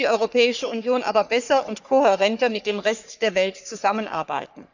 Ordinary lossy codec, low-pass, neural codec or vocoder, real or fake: none; 7.2 kHz; codec, 44.1 kHz, 3.4 kbps, Pupu-Codec; fake